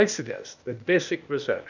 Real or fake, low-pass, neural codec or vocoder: fake; 7.2 kHz; codec, 16 kHz, 0.8 kbps, ZipCodec